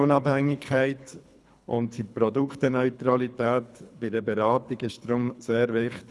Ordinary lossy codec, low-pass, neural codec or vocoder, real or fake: none; none; codec, 24 kHz, 3 kbps, HILCodec; fake